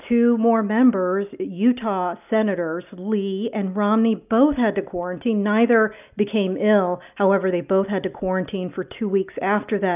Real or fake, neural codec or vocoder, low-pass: real; none; 3.6 kHz